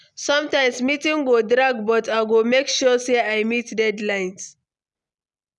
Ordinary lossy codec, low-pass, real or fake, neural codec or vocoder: none; 10.8 kHz; real; none